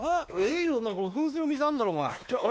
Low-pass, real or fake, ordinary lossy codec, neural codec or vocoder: none; fake; none; codec, 16 kHz, 2 kbps, X-Codec, WavLM features, trained on Multilingual LibriSpeech